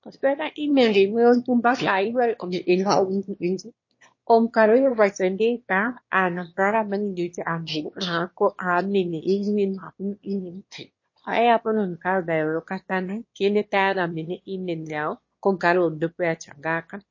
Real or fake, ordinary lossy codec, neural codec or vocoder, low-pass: fake; MP3, 32 kbps; autoencoder, 22.05 kHz, a latent of 192 numbers a frame, VITS, trained on one speaker; 7.2 kHz